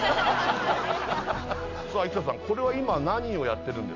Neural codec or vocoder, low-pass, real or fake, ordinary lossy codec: none; 7.2 kHz; real; none